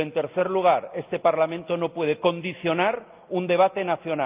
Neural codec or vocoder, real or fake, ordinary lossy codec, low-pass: none; real; Opus, 24 kbps; 3.6 kHz